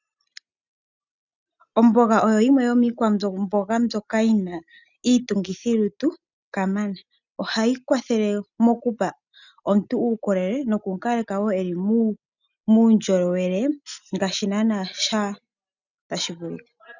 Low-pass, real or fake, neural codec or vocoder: 7.2 kHz; real; none